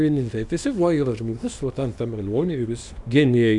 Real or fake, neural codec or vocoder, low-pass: fake; codec, 24 kHz, 0.9 kbps, WavTokenizer, small release; 10.8 kHz